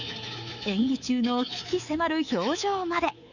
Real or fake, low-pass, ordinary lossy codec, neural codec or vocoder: fake; 7.2 kHz; none; codec, 24 kHz, 3.1 kbps, DualCodec